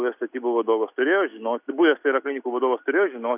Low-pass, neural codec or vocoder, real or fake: 3.6 kHz; none; real